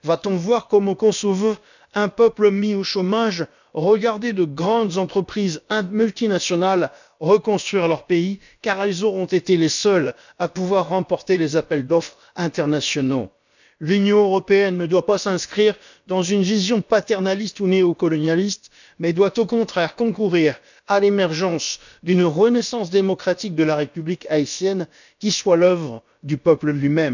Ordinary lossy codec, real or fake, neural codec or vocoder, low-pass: none; fake; codec, 16 kHz, about 1 kbps, DyCAST, with the encoder's durations; 7.2 kHz